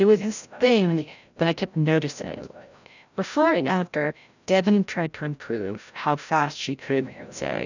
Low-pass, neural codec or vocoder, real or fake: 7.2 kHz; codec, 16 kHz, 0.5 kbps, FreqCodec, larger model; fake